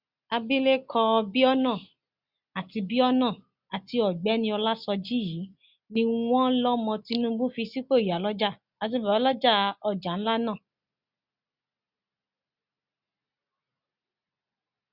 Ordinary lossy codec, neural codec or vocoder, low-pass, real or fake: Opus, 64 kbps; none; 5.4 kHz; real